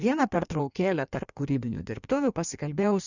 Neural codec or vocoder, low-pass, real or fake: codec, 16 kHz in and 24 kHz out, 1.1 kbps, FireRedTTS-2 codec; 7.2 kHz; fake